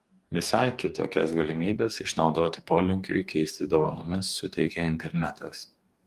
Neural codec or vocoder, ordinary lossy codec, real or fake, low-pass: codec, 44.1 kHz, 2.6 kbps, DAC; Opus, 32 kbps; fake; 14.4 kHz